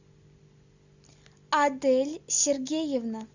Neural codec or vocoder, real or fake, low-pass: none; real; 7.2 kHz